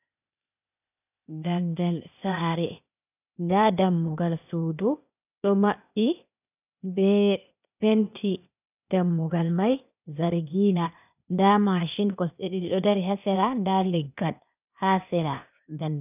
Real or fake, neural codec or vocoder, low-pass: fake; codec, 16 kHz, 0.8 kbps, ZipCodec; 3.6 kHz